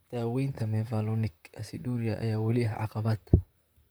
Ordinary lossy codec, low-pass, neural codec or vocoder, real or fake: none; none; vocoder, 44.1 kHz, 128 mel bands, Pupu-Vocoder; fake